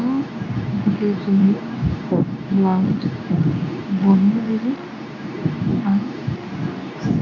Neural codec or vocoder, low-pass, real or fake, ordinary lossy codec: codec, 24 kHz, 0.9 kbps, WavTokenizer, medium speech release version 2; 7.2 kHz; fake; none